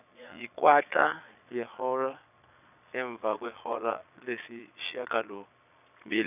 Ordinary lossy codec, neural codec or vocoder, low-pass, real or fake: none; vocoder, 22.05 kHz, 80 mel bands, Vocos; 3.6 kHz; fake